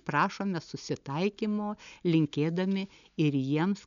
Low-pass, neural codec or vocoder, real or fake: 7.2 kHz; none; real